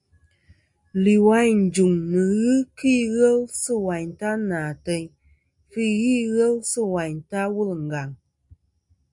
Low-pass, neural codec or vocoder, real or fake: 10.8 kHz; none; real